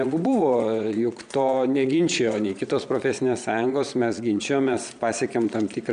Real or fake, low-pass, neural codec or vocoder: fake; 9.9 kHz; vocoder, 22.05 kHz, 80 mel bands, Vocos